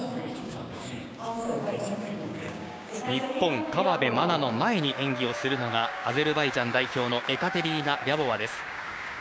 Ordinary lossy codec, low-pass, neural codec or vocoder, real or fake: none; none; codec, 16 kHz, 6 kbps, DAC; fake